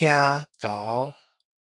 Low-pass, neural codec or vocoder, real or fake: 10.8 kHz; codec, 32 kHz, 1.9 kbps, SNAC; fake